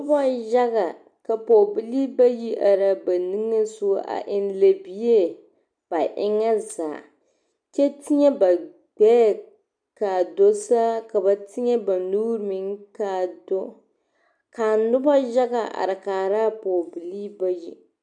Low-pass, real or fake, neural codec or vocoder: 9.9 kHz; real; none